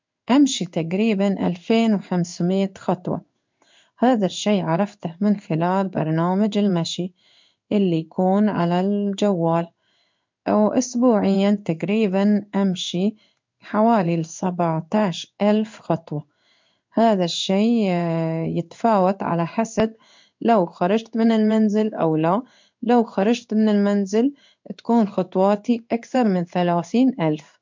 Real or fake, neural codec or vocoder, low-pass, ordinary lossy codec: fake; codec, 16 kHz in and 24 kHz out, 1 kbps, XY-Tokenizer; 7.2 kHz; MP3, 64 kbps